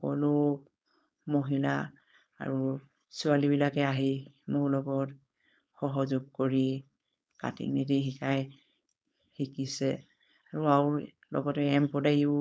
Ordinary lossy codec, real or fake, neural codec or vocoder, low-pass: none; fake; codec, 16 kHz, 4.8 kbps, FACodec; none